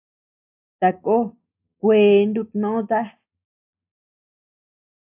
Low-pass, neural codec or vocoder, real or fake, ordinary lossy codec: 3.6 kHz; none; real; AAC, 32 kbps